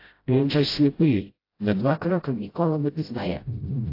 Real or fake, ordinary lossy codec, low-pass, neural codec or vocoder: fake; AAC, 32 kbps; 5.4 kHz; codec, 16 kHz, 0.5 kbps, FreqCodec, smaller model